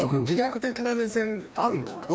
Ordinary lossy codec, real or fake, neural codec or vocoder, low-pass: none; fake; codec, 16 kHz, 1 kbps, FreqCodec, larger model; none